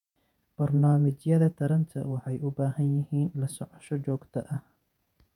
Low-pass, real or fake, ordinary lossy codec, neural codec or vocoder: 19.8 kHz; fake; none; vocoder, 48 kHz, 128 mel bands, Vocos